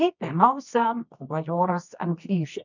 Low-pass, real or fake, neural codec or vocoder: 7.2 kHz; fake; codec, 24 kHz, 0.9 kbps, WavTokenizer, medium music audio release